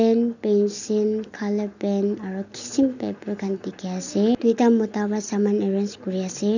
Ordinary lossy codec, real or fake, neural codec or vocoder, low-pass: none; real; none; 7.2 kHz